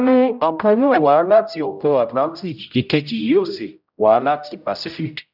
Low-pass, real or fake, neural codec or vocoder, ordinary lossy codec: 5.4 kHz; fake; codec, 16 kHz, 0.5 kbps, X-Codec, HuBERT features, trained on general audio; none